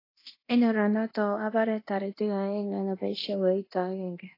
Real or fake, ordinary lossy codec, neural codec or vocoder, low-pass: fake; AAC, 24 kbps; codec, 24 kHz, 0.9 kbps, DualCodec; 5.4 kHz